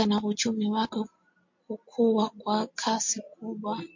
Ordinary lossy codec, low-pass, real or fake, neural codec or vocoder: MP3, 48 kbps; 7.2 kHz; real; none